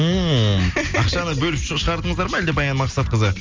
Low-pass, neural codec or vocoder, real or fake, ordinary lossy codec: 7.2 kHz; none; real; Opus, 32 kbps